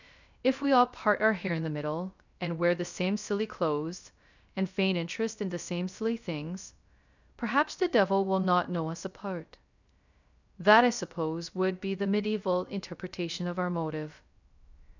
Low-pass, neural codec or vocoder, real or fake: 7.2 kHz; codec, 16 kHz, 0.3 kbps, FocalCodec; fake